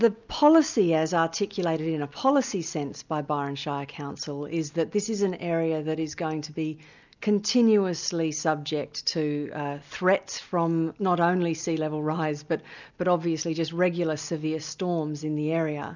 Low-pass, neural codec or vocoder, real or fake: 7.2 kHz; none; real